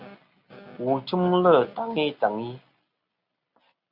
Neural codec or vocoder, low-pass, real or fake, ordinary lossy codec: none; 5.4 kHz; real; Opus, 64 kbps